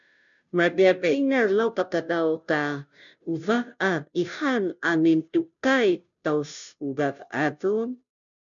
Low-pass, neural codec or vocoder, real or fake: 7.2 kHz; codec, 16 kHz, 0.5 kbps, FunCodec, trained on Chinese and English, 25 frames a second; fake